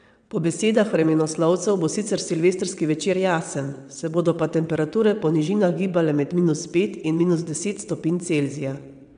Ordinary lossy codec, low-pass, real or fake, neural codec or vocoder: none; 9.9 kHz; fake; vocoder, 22.05 kHz, 80 mel bands, WaveNeXt